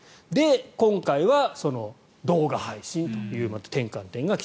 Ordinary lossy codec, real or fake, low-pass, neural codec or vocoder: none; real; none; none